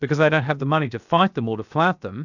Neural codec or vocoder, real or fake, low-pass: codec, 16 kHz, 0.7 kbps, FocalCodec; fake; 7.2 kHz